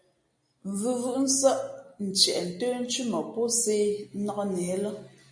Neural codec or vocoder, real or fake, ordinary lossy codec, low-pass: none; real; MP3, 48 kbps; 9.9 kHz